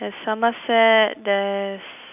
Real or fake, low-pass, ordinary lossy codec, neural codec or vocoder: real; 3.6 kHz; none; none